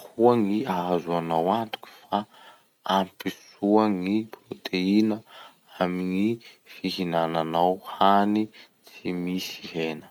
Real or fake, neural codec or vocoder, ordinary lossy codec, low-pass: real; none; none; 19.8 kHz